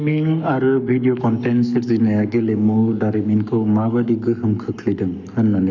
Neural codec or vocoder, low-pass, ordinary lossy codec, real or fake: codec, 44.1 kHz, 7.8 kbps, Pupu-Codec; 7.2 kHz; none; fake